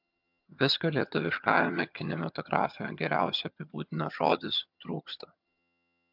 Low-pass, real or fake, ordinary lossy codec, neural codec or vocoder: 5.4 kHz; fake; MP3, 48 kbps; vocoder, 22.05 kHz, 80 mel bands, HiFi-GAN